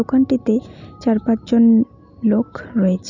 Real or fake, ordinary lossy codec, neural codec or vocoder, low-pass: real; none; none; 7.2 kHz